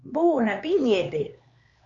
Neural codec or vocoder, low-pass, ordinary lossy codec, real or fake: codec, 16 kHz, 2 kbps, X-Codec, HuBERT features, trained on LibriSpeech; 7.2 kHz; Opus, 64 kbps; fake